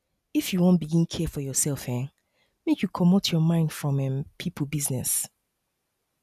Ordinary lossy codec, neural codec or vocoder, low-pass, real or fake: none; none; 14.4 kHz; real